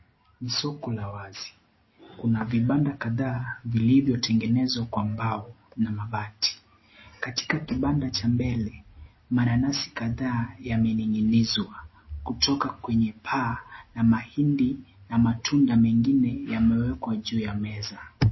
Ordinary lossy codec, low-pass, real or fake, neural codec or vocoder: MP3, 24 kbps; 7.2 kHz; real; none